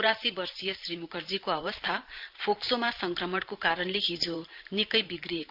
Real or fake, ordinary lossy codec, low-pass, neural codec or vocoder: fake; Opus, 24 kbps; 5.4 kHz; vocoder, 44.1 kHz, 128 mel bands every 512 samples, BigVGAN v2